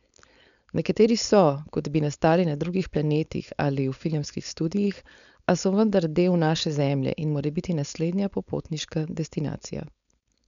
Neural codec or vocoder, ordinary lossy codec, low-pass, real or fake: codec, 16 kHz, 4.8 kbps, FACodec; none; 7.2 kHz; fake